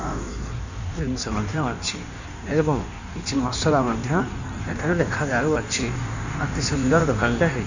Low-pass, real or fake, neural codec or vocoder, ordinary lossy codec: 7.2 kHz; fake; codec, 16 kHz in and 24 kHz out, 1.1 kbps, FireRedTTS-2 codec; none